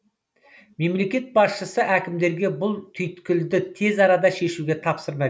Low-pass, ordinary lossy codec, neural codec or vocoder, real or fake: none; none; none; real